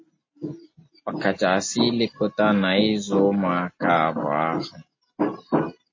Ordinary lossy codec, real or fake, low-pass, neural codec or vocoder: MP3, 32 kbps; real; 7.2 kHz; none